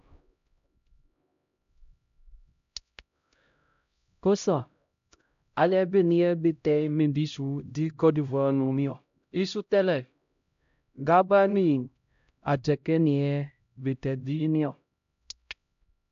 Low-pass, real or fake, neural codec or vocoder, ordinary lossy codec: 7.2 kHz; fake; codec, 16 kHz, 0.5 kbps, X-Codec, HuBERT features, trained on LibriSpeech; AAC, 64 kbps